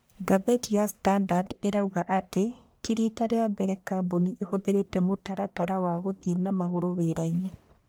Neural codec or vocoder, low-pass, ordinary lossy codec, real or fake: codec, 44.1 kHz, 1.7 kbps, Pupu-Codec; none; none; fake